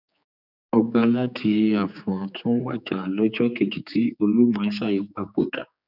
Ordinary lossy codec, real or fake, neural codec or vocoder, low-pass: none; fake; codec, 16 kHz, 4 kbps, X-Codec, HuBERT features, trained on general audio; 5.4 kHz